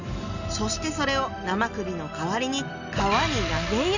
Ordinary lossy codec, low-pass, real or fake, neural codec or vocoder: none; 7.2 kHz; real; none